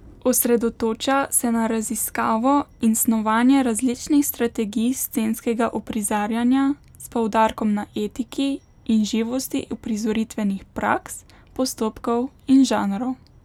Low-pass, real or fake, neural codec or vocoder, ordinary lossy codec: 19.8 kHz; real; none; none